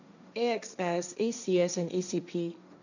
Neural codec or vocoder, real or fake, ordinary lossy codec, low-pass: codec, 16 kHz, 1.1 kbps, Voila-Tokenizer; fake; none; 7.2 kHz